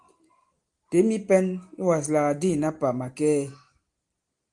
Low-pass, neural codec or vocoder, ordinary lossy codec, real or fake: 10.8 kHz; none; Opus, 24 kbps; real